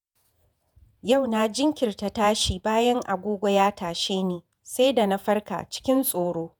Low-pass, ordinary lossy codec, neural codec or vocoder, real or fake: none; none; vocoder, 48 kHz, 128 mel bands, Vocos; fake